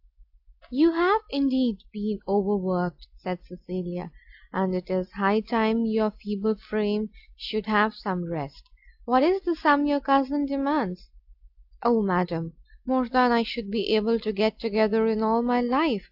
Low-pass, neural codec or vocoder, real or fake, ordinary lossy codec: 5.4 kHz; none; real; AAC, 48 kbps